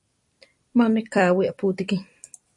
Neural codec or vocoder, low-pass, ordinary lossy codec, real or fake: vocoder, 44.1 kHz, 128 mel bands every 256 samples, BigVGAN v2; 10.8 kHz; MP3, 48 kbps; fake